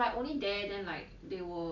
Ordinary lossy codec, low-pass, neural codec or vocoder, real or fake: none; 7.2 kHz; none; real